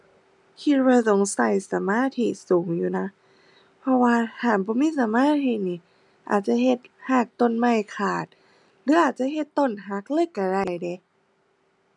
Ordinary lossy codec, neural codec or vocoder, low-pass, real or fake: none; none; 10.8 kHz; real